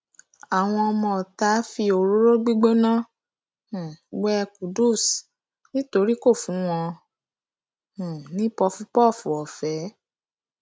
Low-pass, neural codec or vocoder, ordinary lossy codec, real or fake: none; none; none; real